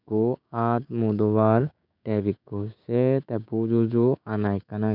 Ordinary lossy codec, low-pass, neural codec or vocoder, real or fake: none; 5.4 kHz; codec, 16 kHz, 6 kbps, DAC; fake